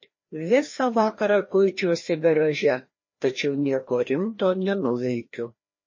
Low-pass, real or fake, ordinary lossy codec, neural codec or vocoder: 7.2 kHz; fake; MP3, 32 kbps; codec, 16 kHz, 1 kbps, FreqCodec, larger model